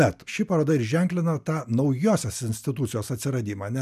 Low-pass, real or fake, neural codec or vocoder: 14.4 kHz; real; none